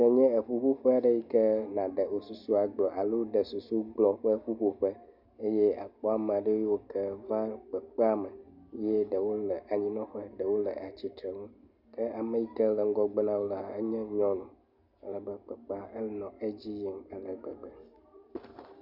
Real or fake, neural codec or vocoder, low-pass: real; none; 5.4 kHz